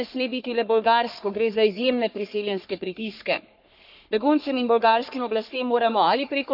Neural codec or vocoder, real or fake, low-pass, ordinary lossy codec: codec, 44.1 kHz, 3.4 kbps, Pupu-Codec; fake; 5.4 kHz; none